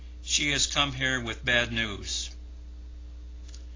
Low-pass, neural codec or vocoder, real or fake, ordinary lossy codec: 7.2 kHz; none; real; AAC, 32 kbps